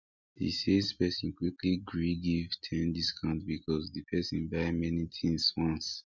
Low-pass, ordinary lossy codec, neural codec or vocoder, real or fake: 7.2 kHz; none; none; real